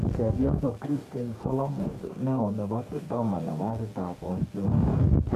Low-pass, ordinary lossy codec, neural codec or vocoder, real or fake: 14.4 kHz; MP3, 64 kbps; codec, 44.1 kHz, 2.6 kbps, DAC; fake